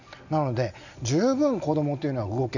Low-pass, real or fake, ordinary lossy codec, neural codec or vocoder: 7.2 kHz; real; none; none